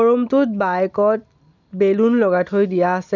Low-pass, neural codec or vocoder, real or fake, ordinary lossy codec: 7.2 kHz; none; real; none